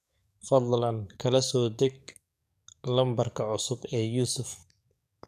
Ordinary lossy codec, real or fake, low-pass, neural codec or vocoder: none; fake; 14.4 kHz; codec, 44.1 kHz, 7.8 kbps, DAC